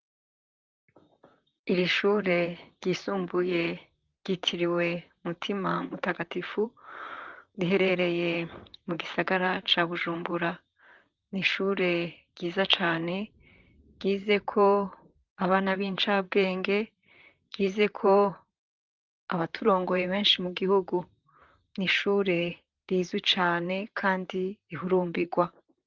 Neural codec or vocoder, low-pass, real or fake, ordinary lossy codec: vocoder, 44.1 kHz, 128 mel bands, Pupu-Vocoder; 7.2 kHz; fake; Opus, 32 kbps